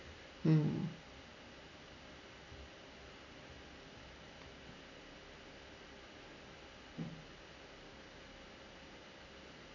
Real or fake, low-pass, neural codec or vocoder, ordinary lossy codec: real; 7.2 kHz; none; none